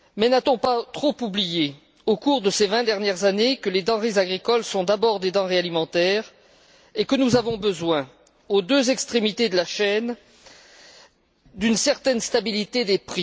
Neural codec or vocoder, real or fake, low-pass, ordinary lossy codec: none; real; none; none